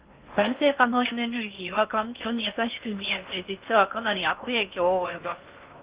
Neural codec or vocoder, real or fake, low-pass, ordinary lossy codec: codec, 16 kHz in and 24 kHz out, 0.6 kbps, FocalCodec, streaming, 4096 codes; fake; 3.6 kHz; Opus, 32 kbps